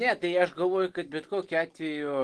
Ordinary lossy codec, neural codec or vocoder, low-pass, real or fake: Opus, 16 kbps; none; 10.8 kHz; real